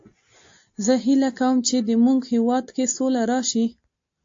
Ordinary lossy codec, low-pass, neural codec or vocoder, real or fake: AAC, 48 kbps; 7.2 kHz; none; real